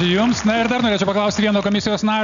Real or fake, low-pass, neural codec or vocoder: real; 7.2 kHz; none